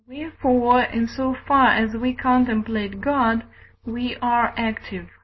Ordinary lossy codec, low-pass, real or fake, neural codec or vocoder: MP3, 24 kbps; 7.2 kHz; real; none